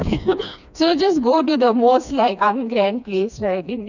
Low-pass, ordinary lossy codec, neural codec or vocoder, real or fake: 7.2 kHz; none; codec, 16 kHz, 2 kbps, FreqCodec, smaller model; fake